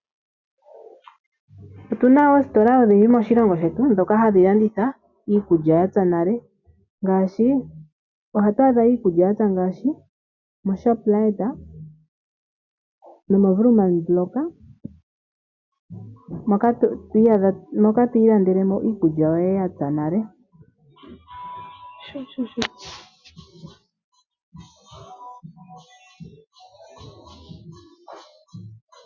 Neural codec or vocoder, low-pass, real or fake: none; 7.2 kHz; real